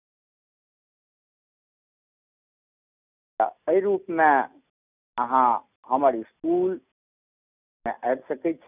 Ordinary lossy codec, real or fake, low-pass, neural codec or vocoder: none; real; 3.6 kHz; none